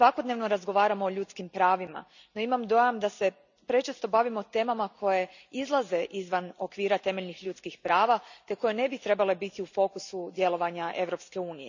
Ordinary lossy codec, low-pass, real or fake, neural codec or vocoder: none; 7.2 kHz; real; none